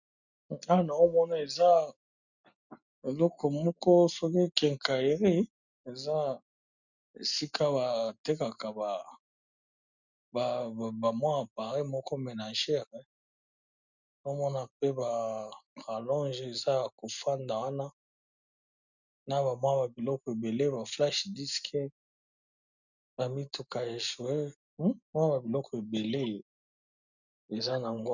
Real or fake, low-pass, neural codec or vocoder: real; 7.2 kHz; none